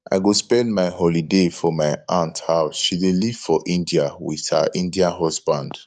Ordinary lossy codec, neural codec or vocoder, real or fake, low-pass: none; codec, 44.1 kHz, 7.8 kbps, DAC; fake; 10.8 kHz